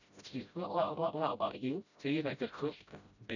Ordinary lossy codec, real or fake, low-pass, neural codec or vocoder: none; fake; 7.2 kHz; codec, 16 kHz, 0.5 kbps, FreqCodec, smaller model